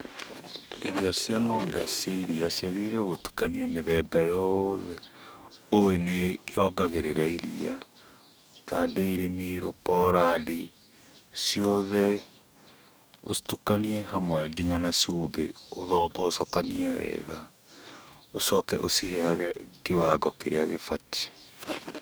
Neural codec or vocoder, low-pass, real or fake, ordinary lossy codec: codec, 44.1 kHz, 2.6 kbps, DAC; none; fake; none